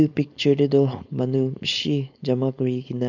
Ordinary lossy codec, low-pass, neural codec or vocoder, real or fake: none; 7.2 kHz; codec, 16 kHz, 4.8 kbps, FACodec; fake